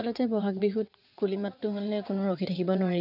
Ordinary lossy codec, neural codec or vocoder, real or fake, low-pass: none; vocoder, 22.05 kHz, 80 mel bands, WaveNeXt; fake; 5.4 kHz